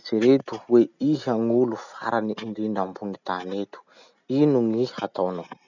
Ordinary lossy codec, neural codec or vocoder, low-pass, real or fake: none; none; 7.2 kHz; real